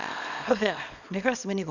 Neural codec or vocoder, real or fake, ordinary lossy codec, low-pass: codec, 24 kHz, 0.9 kbps, WavTokenizer, small release; fake; none; 7.2 kHz